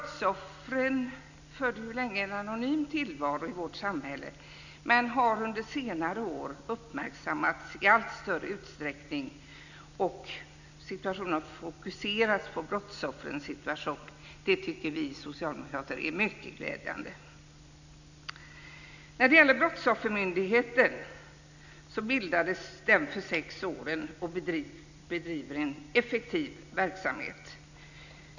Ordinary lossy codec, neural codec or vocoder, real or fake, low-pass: none; none; real; 7.2 kHz